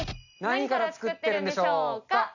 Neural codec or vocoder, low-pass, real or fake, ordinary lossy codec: none; 7.2 kHz; real; none